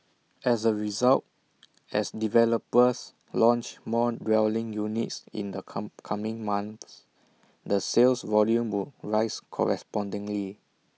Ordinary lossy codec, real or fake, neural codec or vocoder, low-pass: none; real; none; none